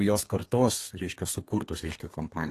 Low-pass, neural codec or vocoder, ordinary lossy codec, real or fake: 14.4 kHz; codec, 32 kHz, 1.9 kbps, SNAC; AAC, 48 kbps; fake